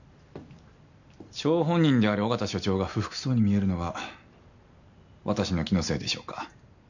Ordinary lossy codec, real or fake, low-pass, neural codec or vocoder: none; real; 7.2 kHz; none